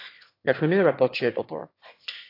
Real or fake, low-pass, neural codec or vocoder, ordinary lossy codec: fake; 5.4 kHz; autoencoder, 22.05 kHz, a latent of 192 numbers a frame, VITS, trained on one speaker; AAC, 32 kbps